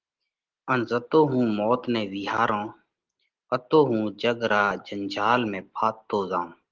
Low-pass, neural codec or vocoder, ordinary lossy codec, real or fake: 7.2 kHz; none; Opus, 16 kbps; real